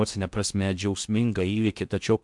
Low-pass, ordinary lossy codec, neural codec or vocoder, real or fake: 10.8 kHz; MP3, 96 kbps; codec, 16 kHz in and 24 kHz out, 0.6 kbps, FocalCodec, streaming, 2048 codes; fake